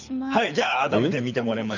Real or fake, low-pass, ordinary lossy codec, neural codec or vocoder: fake; 7.2 kHz; none; codec, 24 kHz, 6 kbps, HILCodec